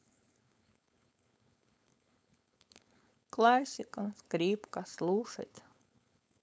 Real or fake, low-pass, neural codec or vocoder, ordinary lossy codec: fake; none; codec, 16 kHz, 4.8 kbps, FACodec; none